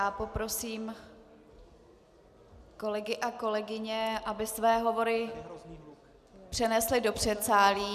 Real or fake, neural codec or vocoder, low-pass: real; none; 14.4 kHz